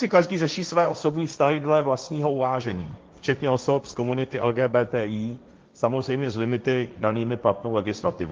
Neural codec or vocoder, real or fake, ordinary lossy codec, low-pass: codec, 16 kHz, 1.1 kbps, Voila-Tokenizer; fake; Opus, 24 kbps; 7.2 kHz